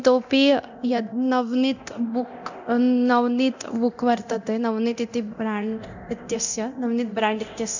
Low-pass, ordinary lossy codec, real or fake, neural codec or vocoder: 7.2 kHz; none; fake; codec, 24 kHz, 0.9 kbps, DualCodec